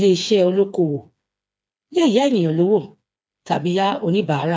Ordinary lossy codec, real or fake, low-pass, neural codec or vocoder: none; fake; none; codec, 16 kHz, 4 kbps, FreqCodec, smaller model